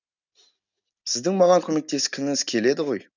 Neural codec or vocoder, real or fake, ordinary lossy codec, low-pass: none; real; none; 7.2 kHz